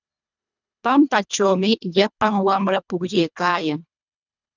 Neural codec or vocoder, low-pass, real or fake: codec, 24 kHz, 1.5 kbps, HILCodec; 7.2 kHz; fake